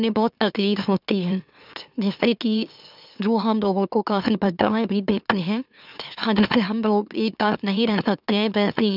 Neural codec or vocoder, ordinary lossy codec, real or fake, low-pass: autoencoder, 44.1 kHz, a latent of 192 numbers a frame, MeloTTS; none; fake; 5.4 kHz